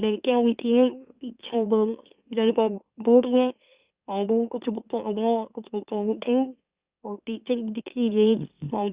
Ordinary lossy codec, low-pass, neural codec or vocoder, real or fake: Opus, 64 kbps; 3.6 kHz; autoencoder, 44.1 kHz, a latent of 192 numbers a frame, MeloTTS; fake